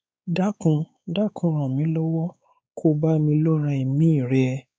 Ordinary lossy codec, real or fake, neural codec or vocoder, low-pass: none; fake; codec, 16 kHz, 4 kbps, X-Codec, WavLM features, trained on Multilingual LibriSpeech; none